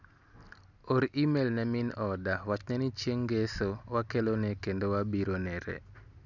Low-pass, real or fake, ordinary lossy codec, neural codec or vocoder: 7.2 kHz; real; none; none